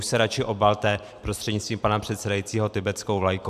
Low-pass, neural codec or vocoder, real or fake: 14.4 kHz; vocoder, 44.1 kHz, 128 mel bands every 512 samples, BigVGAN v2; fake